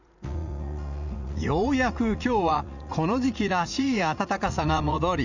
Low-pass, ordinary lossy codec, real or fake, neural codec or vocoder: 7.2 kHz; none; fake; vocoder, 44.1 kHz, 80 mel bands, Vocos